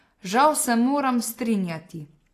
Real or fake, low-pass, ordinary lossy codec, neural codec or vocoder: real; 14.4 kHz; AAC, 48 kbps; none